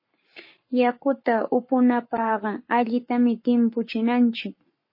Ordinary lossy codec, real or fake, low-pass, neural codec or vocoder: MP3, 24 kbps; fake; 5.4 kHz; codec, 44.1 kHz, 7.8 kbps, Pupu-Codec